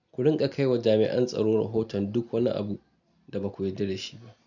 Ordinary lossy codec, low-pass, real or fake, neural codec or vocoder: none; 7.2 kHz; real; none